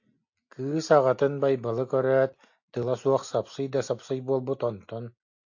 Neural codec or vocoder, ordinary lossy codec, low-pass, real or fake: none; AAC, 48 kbps; 7.2 kHz; real